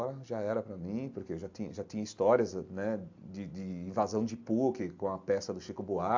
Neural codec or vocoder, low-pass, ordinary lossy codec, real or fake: none; 7.2 kHz; AAC, 48 kbps; real